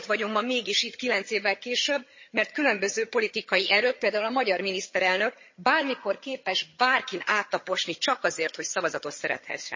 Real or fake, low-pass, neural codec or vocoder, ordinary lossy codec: fake; 7.2 kHz; vocoder, 22.05 kHz, 80 mel bands, HiFi-GAN; MP3, 32 kbps